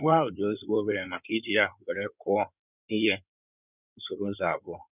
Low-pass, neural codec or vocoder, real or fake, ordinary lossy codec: 3.6 kHz; codec, 16 kHz in and 24 kHz out, 2.2 kbps, FireRedTTS-2 codec; fake; none